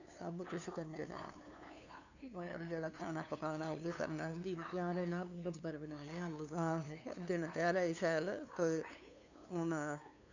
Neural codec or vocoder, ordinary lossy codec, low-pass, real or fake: codec, 16 kHz, 2 kbps, FunCodec, trained on LibriTTS, 25 frames a second; none; 7.2 kHz; fake